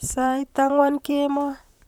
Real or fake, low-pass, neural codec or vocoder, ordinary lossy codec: fake; 19.8 kHz; vocoder, 44.1 kHz, 128 mel bands, Pupu-Vocoder; none